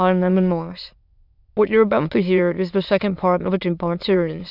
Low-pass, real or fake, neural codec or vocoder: 5.4 kHz; fake; autoencoder, 22.05 kHz, a latent of 192 numbers a frame, VITS, trained on many speakers